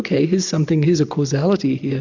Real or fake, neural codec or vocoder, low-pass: real; none; 7.2 kHz